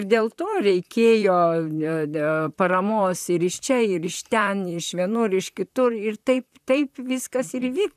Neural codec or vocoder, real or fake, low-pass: vocoder, 44.1 kHz, 128 mel bands, Pupu-Vocoder; fake; 14.4 kHz